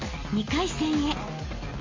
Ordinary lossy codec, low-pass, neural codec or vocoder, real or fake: MP3, 32 kbps; 7.2 kHz; none; real